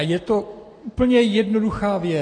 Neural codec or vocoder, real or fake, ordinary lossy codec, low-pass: none; real; AAC, 32 kbps; 9.9 kHz